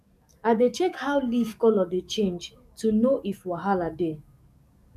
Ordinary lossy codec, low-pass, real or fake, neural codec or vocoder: none; 14.4 kHz; fake; codec, 44.1 kHz, 7.8 kbps, DAC